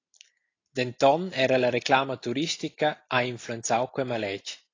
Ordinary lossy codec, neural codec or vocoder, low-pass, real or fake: AAC, 32 kbps; none; 7.2 kHz; real